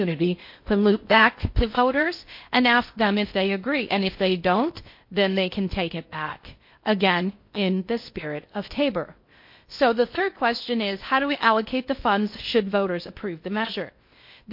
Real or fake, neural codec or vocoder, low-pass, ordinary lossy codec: fake; codec, 16 kHz in and 24 kHz out, 0.6 kbps, FocalCodec, streaming, 2048 codes; 5.4 kHz; MP3, 32 kbps